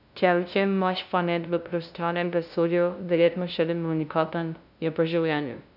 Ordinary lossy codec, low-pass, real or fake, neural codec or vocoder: none; 5.4 kHz; fake; codec, 16 kHz, 0.5 kbps, FunCodec, trained on LibriTTS, 25 frames a second